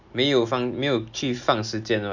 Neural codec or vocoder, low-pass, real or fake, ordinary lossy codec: none; 7.2 kHz; real; none